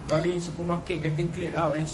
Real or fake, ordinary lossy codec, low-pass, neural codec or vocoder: fake; MP3, 48 kbps; 14.4 kHz; codec, 32 kHz, 1.9 kbps, SNAC